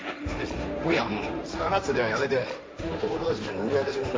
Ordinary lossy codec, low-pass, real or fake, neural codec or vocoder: none; none; fake; codec, 16 kHz, 1.1 kbps, Voila-Tokenizer